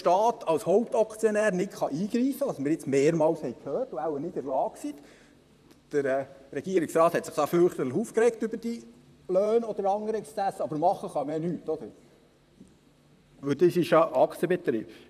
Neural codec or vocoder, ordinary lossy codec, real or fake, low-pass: vocoder, 44.1 kHz, 128 mel bands, Pupu-Vocoder; none; fake; 14.4 kHz